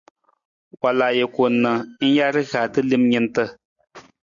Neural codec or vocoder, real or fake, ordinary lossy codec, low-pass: none; real; MP3, 96 kbps; 7.2 kHz